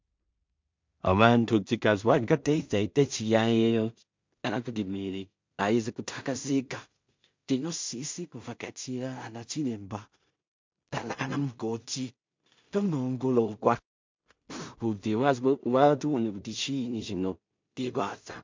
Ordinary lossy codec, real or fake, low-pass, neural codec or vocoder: MP3, 64 kbps; fake; 7.2 kHz; codec, 16 kHz in and 24 kHz out, 0.4 kbps, LongCat-Audio-Codec, two codebook decoder